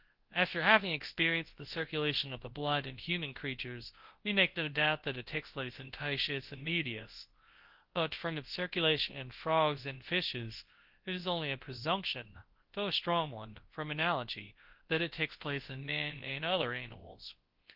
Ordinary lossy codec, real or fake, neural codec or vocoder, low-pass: Opus, 16 kbps; fake; codec, 24 kHz, 0.9 kbps, WavTokenizer, large speech release; 5.4 kHz